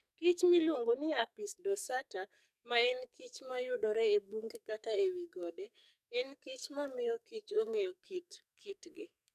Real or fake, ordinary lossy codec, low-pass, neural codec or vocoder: fake; none; 14.4 kHz; codec, 44.1 kHz, 2.6 kbps, SNAC